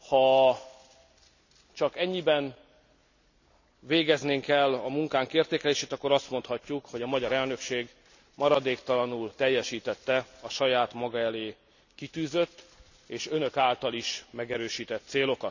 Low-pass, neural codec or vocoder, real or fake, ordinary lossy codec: 7.2 kHz; none; real; none